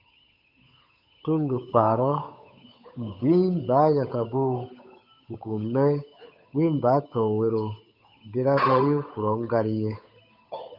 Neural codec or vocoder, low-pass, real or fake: codec, 16 kHz, 8 kbps, FunCodec, trained on Chinese and English, 25 frames a second; 5.4 kHz; fake